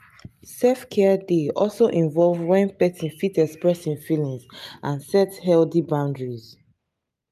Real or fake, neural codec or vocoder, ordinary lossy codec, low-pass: real; none; none; 14.4 kHz